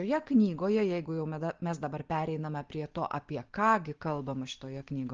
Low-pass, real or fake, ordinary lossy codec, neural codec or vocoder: 7.2 kHz; real; Opus, 16 kbps; none